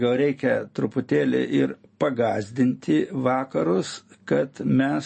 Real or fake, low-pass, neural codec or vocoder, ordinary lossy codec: real; 10.8 kHz; none; MP3, 32 kbps